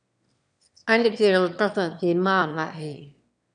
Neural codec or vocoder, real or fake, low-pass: autoencoder, 22.05 kHz, a latent of 192 numbers a frame, VITS, trained on one speaker; fake; 9.9 kHz